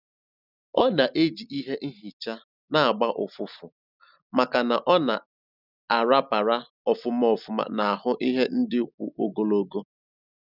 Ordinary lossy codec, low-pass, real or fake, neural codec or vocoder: none; 5.4 kHz; real; none